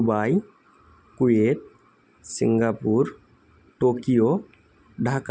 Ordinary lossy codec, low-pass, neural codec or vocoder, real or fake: none; none; none; real